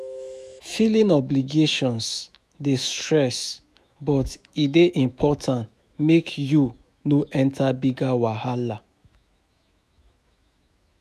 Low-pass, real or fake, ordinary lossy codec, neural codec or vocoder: 14.4 kHz; fake; none; autoencoder, 48 kHz, 128 numbers a frame, DAC-VAE, trained on Japanese speech